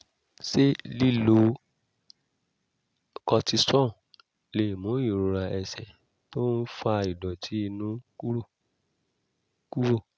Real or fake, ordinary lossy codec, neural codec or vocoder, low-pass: real; none; none; none